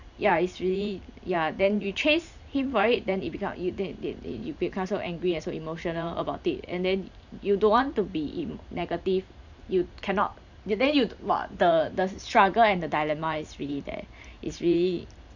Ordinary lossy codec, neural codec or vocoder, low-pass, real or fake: none; vocoder, 44.1 kHz, 128 mel bands every 512 samples, BigVGAN v2; 7.2 kHz; fake